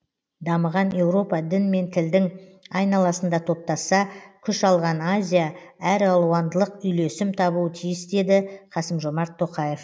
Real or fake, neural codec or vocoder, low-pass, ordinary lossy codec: real; none; none; none